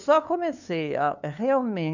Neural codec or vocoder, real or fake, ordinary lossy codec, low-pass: codec, 16 kHz, 2 kbps, FunCodec, trained on LibriTTS, 25 frames a second; fake; none; 7.2 kHz